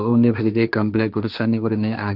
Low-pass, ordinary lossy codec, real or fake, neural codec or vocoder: 5.4 kHz; none; fake; codec, 16 kHz, 1.1 kbps, Voila-Tokenizer